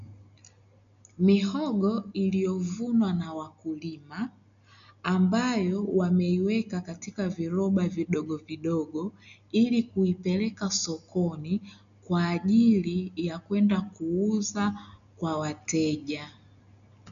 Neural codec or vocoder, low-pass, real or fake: none; 7.2 kHz; real